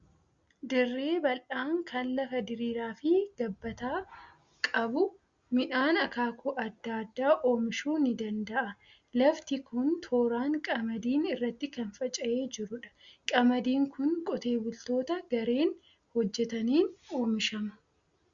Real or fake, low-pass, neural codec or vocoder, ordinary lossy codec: real; 7.2 kHz; none; Opus, 64 kbps